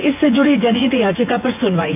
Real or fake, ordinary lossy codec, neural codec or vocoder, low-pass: fake; none; vocoder, 24 kHz, 100 mel bands, Vocos; 3.6 kHz